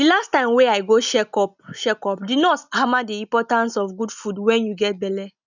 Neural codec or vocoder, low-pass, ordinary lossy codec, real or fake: none; 7.2 kHz; none; real